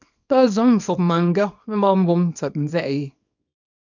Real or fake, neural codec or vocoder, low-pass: fake; codec, 24 kHz, 0.9 kbps, WavTokenizer, small release; 7.2 kHz